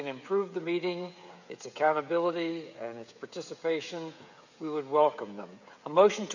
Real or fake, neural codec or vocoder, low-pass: fake; codec, 16 kHz, 16 kbps, FreqCodec, smaller model; 7.2 kHz